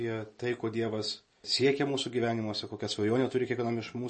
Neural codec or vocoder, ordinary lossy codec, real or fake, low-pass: none; MP3, 32 kbps; real; 10.8 kHz